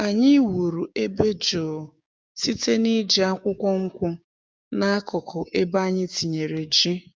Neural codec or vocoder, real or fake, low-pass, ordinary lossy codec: codec, 16 kHz, 6 kbps, DAC; fake; none; none